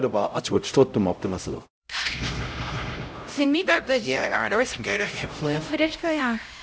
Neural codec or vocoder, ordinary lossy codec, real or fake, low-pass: codec, 16 kHz, 0.5 kbps, X-Codec, HuBERT features, trained on LibriSpeech; none; fake; none